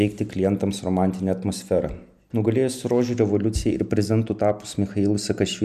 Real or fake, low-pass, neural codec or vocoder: real; 14.4 kHz; none